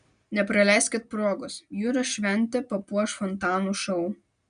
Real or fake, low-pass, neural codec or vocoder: real; 9.9 kHz; none